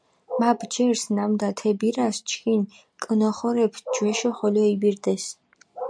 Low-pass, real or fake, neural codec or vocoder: 9.9 kHz; real; none